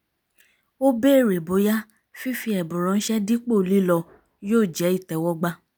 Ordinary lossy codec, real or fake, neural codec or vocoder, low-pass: none; real; none; none